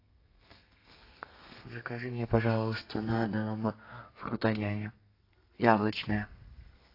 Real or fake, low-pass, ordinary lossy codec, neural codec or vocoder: fake; 5.4 kHz; AAC, 32 kbps; codec, 32 kHz, 1.9 kbps, SNAC